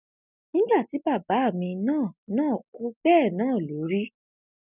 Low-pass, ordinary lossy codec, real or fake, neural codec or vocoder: 3.6 kHz; none; real; none